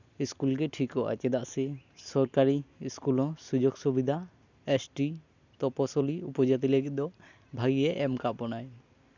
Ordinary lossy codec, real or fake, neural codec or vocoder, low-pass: none; real; none; 7.2 kHz